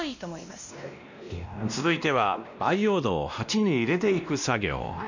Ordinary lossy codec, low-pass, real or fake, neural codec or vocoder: none; 7.2 kHz; fake; codec, 16 kHz, 1 kbps, X-Codec, WavLM features, trained on Multilingual LibriSpeech